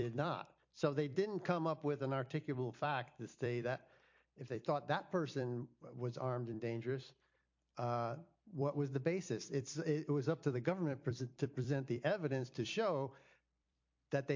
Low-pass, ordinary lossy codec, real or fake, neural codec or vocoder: 7.2 kHz; MP3, 48 kbps; fake; vocoder, 44.1 kHz, 128 mel bands every 256 samples, BigVGAN v2